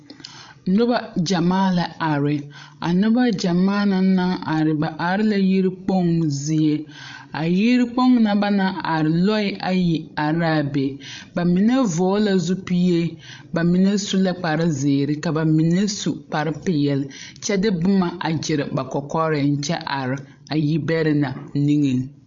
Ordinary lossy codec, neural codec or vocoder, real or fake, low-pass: MP3, 48 kbps; codec, 16 kHz, 16 kbps, FreqCodec, larger model; fake; 7.2 kHz